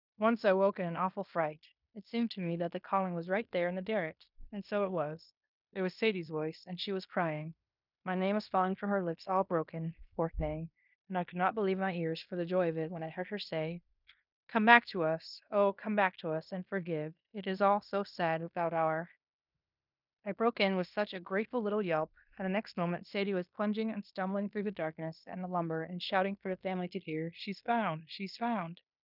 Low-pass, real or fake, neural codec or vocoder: 5.4 kHz; fake; codec, 16 kHz in and 24 kHz out, 0.9 kbps, LongCat-Audio-Codec, fine tuned four codebook decoder